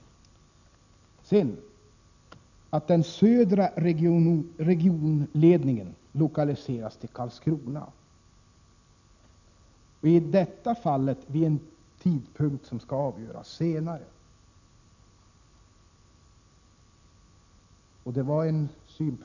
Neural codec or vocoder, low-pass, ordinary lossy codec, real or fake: none; 7.2 kHz; none; real